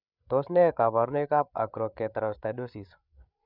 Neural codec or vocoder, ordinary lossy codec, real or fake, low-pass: codec, 16 kHz, 8 kbps, FreqCodec, larger model; none; fake; 5.4 kHz